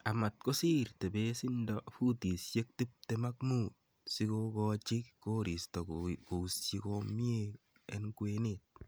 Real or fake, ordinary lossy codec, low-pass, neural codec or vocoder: real; none; none; none